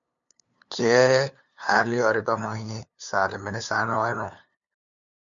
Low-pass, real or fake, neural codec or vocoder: 7.2 kHz; fake; codec, 16 kHz, 2 kbps, FunCodec, trained on LibriTTS, 25 frames a second